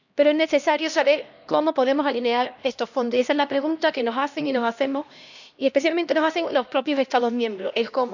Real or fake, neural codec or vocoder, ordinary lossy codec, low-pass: fake; codec, 16 kHz, 1 kbps, X-Codec, HuBERT features, trained on LibriSpeech; none; 7.2 kHz